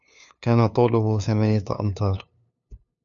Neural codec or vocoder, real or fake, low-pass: codec, 16 kHz, 2 kbps, FunCodec, trained on LibriTTS, 25 frames a second; fake; 7.2 kHz